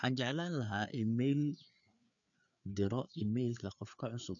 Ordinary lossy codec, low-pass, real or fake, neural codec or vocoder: none; 7.2 kHz; fake; codec, 16 kHz, 4 kbps, FreqCodec, larger model